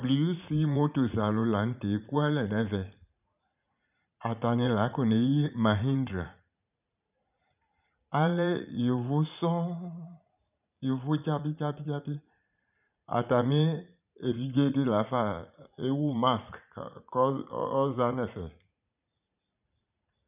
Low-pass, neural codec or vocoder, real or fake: 3.6 kHz; vocoder, 44.1 kHz, 80 mel bands, Vocos; fake